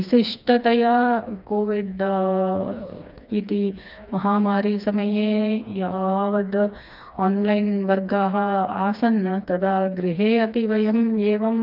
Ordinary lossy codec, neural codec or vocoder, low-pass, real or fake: none; codec, 16 kHz, 2 kbps, FreqCodec, smaller model; 5.4 kHz; fake